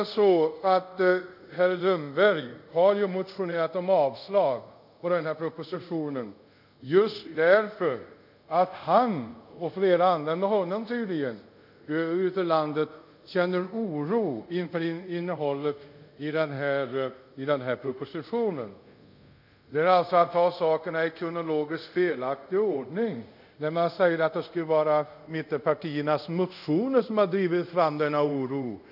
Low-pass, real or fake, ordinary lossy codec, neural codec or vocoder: 5.4 kHz; fake; none; codec, 24 kHz, 0.5 kbps, DualCodec